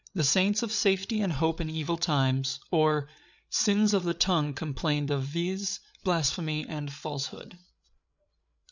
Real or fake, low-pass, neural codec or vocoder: fake; 7.2 kHz; codec, 16 kHz, 8 kbps, FreqCodec, larger model